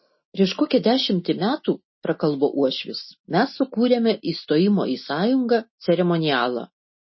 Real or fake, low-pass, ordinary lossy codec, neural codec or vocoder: real; 7.2 kHz; MP3, 24 kbps; none